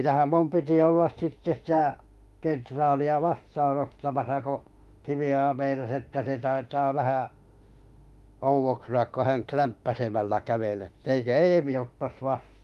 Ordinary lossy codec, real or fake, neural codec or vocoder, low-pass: Opus, 32 kbps; fake; autoencoder, 48 kHz, 32 numbers a frame, DAC-VAE, trained on Japanese speech; 14.4 kHz